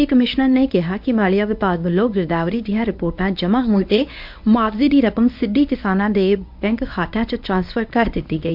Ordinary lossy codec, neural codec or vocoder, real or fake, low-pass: MP3, 48 kbps; codec, 24 kHz, 0.9 kbps, WavTokenizer, medium speech release version 1; fake; 5.4 kHz